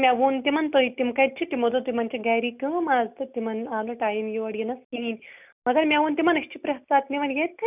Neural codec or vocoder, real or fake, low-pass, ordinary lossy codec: none; real; 3.6 kHz; none